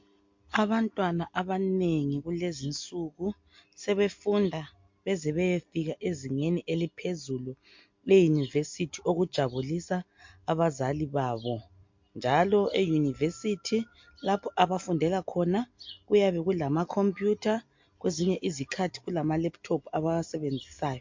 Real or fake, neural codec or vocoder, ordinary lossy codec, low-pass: real; none; MP3, 48 kbps; 7.2 kHz